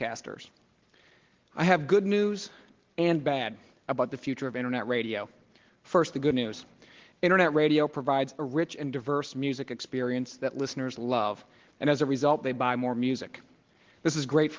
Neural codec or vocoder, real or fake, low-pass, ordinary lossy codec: none; real; 7.2 kHz; Opus, 16 kbps